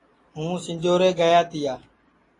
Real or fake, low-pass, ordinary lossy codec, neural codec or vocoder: real; 10.8 kHz; AAC, 32 kbps; none